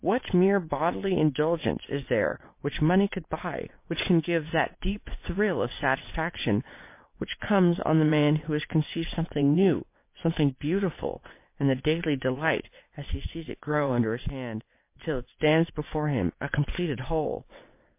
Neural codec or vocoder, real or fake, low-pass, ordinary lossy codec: vocoder, 22.05 kHz, 80 mel bands, WaveNeXt; fake; 3.6 kHz; MP3, 24 kbps